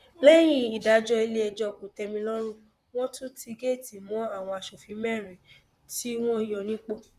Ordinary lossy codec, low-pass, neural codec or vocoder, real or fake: Opus, 64 kbps; 14.4 kHz; vocoder, 44.1 kHz, 128 mel bands, Pupu-Vocoder; fake